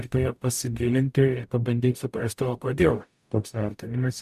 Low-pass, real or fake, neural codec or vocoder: 14.4 kHz; fake; codec, 44.1 kHz, 0.9 kbps, DAC